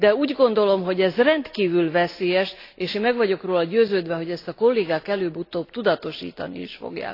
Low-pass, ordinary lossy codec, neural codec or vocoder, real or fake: 5.4 kHz; AAC, 32 kbps; none; real